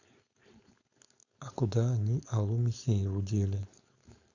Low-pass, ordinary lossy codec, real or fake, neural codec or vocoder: 7.2 kHz; Opus, 64 kbps; fake; codec, 16 kHz, 4.8 kbps, FACodec